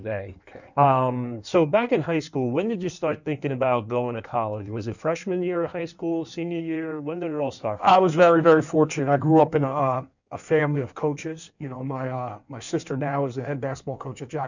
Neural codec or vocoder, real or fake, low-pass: codec, 16 kHz in and 24 kHz out, 1.1 kbps, FireRedTTS-2 codec; fake; 7.2 kHz